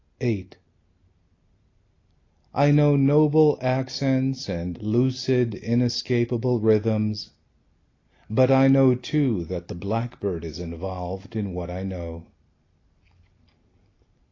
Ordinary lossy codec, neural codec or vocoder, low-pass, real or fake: AAC, 32 kbps; none; 7.2 kHz; real